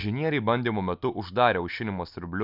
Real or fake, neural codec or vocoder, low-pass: real; none; 5.4 kHz